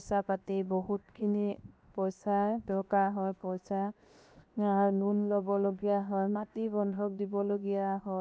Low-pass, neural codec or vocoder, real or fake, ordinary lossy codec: none; codec, 16 kHz, 0.7 kbps, FocalCodec; fake; none